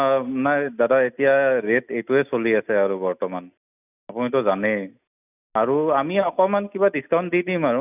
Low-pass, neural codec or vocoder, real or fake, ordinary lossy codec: 3.6 kHz; none; real; none